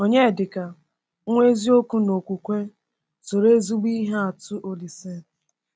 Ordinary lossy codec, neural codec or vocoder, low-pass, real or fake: none; none; none; real